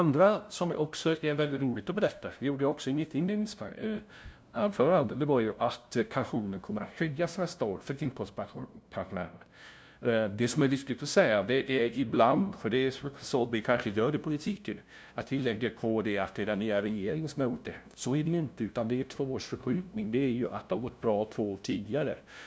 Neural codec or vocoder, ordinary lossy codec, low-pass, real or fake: codec, 16 kHz, 0.5 kbps, FunCodec, trained on LibriTTS, 25 frames a second; none; none; fake